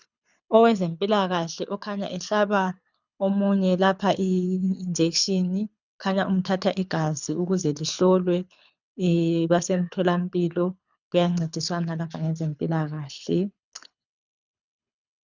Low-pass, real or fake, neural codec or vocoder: 7.2 kHz; fake; codec, 24 kHz, 6 kbps, HILCodec